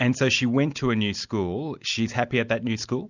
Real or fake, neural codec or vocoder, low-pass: real; none; 7.2 kHz